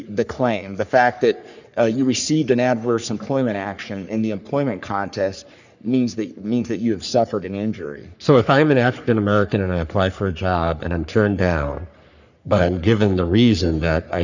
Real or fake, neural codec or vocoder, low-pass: fake; codec, 44.1 kHz, 3.4 kbps, Pupu-Codec; 7.2 kHz